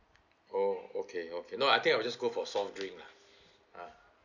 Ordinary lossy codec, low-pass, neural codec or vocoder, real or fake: none; 7.2 kHz; none; real